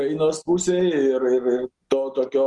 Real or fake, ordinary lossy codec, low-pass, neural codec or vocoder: real; Opus, 24 kbps; 10.8 kHz; none